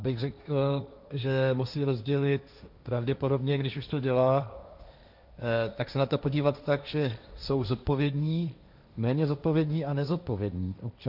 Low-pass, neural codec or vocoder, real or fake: 5.4 kHz; codec, 16 kHz, 1.1 kbps, Voila-Tokenizer; fake